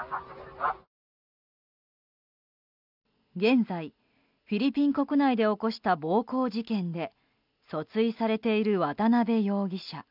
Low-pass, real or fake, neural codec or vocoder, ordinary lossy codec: 5.4 kHz; real; none; none